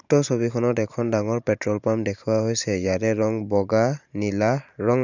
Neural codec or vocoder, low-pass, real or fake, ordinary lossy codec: vocoder, 44.1 kHz, 128 mel bands every 512 samples, BigVGAN v2; 7.2 kHz; fake; none